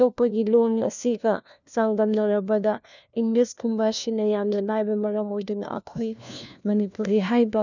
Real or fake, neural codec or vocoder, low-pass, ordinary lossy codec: fake; codec, 16 kHz, 1 kbps, FunCodec, trained on LibriTTS, 50 frames a second; 7.2 kHz; none